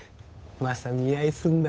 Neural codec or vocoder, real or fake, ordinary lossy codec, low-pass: codec, 16 kHz, 8 kbps, FunCodec, trained on Chinese and English, 25 frames a second; fake; none; none